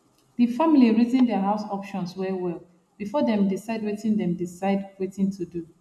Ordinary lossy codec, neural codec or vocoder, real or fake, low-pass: none; none; real; none